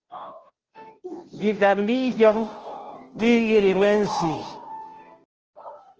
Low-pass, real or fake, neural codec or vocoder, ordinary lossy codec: 7.2 kHz; fake; codec, 16 kHz, 0.5 kbps, FunCodec, trained on Chinese and English, 25 frames a second; Opus, 16 kbps